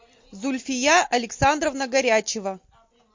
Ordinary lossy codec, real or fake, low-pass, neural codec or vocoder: MP3, 48 kbps; real; 7.2 kHz; none